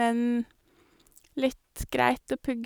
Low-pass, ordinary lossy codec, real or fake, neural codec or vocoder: none; none; real; none